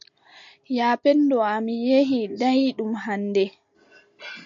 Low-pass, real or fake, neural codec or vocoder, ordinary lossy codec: 7.2 kHz; real; none; MP3, 48 kbps